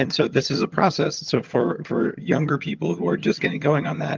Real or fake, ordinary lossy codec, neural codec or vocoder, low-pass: fake; Opus, 24 kbps; vocoder, 22.05 kHz, 80 mel bands, HiFi-GAN; 7.2 kHz